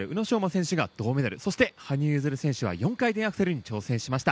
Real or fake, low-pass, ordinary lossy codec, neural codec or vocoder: real; none; none; none